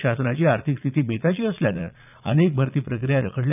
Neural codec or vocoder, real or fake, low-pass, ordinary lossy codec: vocoder, 22.05 kHz, 80 mel bands, Vocos; fake; 3.6 kHz; none